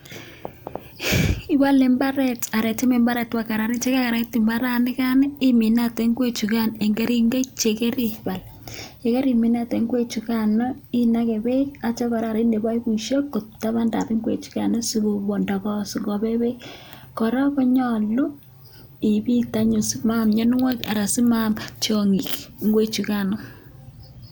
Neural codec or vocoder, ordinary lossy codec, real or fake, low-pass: none; none; real; none